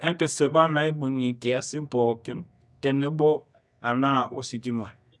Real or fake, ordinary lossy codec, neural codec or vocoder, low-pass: fake; none; codec, 24 kHz, 0.9 kbps, WavTokenizer, medium music audio release; none